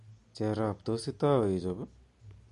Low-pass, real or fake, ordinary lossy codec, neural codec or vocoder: 14.4 kHz; real; MP3, 48 kbps; none